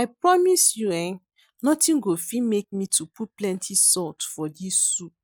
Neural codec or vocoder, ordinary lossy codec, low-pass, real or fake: none; none; none; real